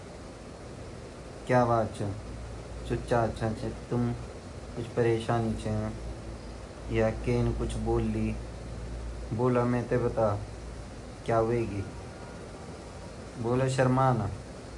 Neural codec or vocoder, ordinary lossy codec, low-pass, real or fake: none; AAC, 64 kbps; 10.8 kHz; real